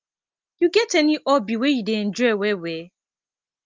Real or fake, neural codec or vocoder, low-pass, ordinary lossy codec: real; none; 7.2 kHz; Opus, 32 kbps